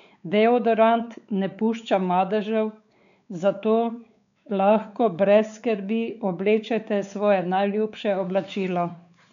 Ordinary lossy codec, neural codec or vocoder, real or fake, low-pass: none; codec, 16 kHz, 4 kbps, X-Codec, WavLM features, trained on Multilingual LibriSpeech; fake; 7.2 kHz